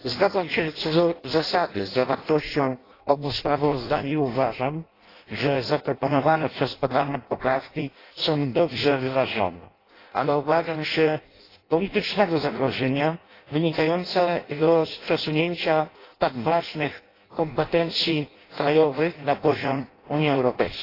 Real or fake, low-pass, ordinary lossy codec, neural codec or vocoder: fake; 5.4 kHz; AAC, 24 kbps; codec, 16 kHz in and 24 kHz out, 0.6 kbps, FireRedTTS-2 codec